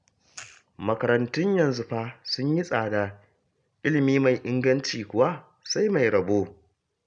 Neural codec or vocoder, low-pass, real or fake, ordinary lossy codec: none; 9.9 kHz; real; none